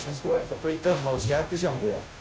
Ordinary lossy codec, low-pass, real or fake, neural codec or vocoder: none; none; fake; codec, 16 kHz, 0.5 kbps, FunCodec, trained on Chinese and English, 25 frames a second